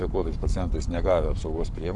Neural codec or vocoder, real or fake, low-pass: codec, 44.1 kHz, 7.8 kbps, Pupu-Codec; fake; 10.8 kHz